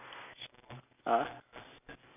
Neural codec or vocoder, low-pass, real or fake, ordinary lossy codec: none; 3.6 kHz; real; none